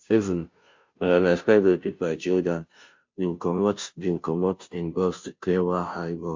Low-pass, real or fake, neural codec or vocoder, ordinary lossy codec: 7.2 kHz; fake; codec, 16 kHz, 0.5 kbps, FunCodec, trained on Chinese and English, 25 frames a second; MP3, 48 kbps